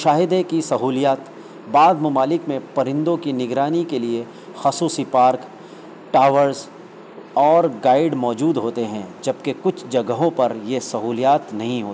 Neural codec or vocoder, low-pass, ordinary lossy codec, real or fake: none; none; none; real